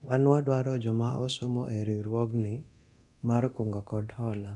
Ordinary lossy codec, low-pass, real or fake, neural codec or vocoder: none; 10.8 kHz; fake; codec, 24 kHz, 0.9 kbps, DualCodec